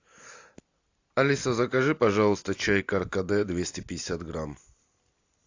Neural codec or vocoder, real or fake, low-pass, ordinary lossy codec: none; real; 7.2 kHz; AAC, 48 kbps